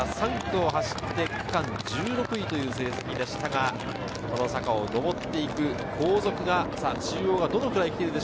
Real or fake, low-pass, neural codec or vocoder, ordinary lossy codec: real; none; none; none